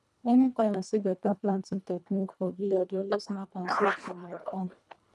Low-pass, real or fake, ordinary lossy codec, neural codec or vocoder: none; fake; none; codec, 24 kHz, 1.5 kbps, HILCodec